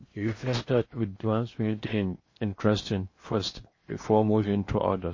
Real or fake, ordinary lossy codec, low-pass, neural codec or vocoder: fake; MP3, 32 kbps; 7.2 kHz; codec, 16 kHz in and 24 kHz out, 0.8 kbps, FocalCodec, streaming, 65536 codes